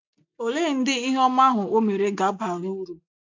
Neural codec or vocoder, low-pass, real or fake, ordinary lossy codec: none; 7.2 kHz; real; MP3, 64 kbps